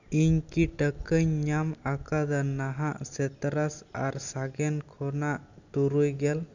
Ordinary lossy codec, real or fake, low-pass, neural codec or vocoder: none; real; 7.2 kHz; none